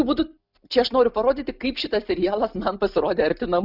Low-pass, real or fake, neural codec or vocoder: 5.4 kHz; real; none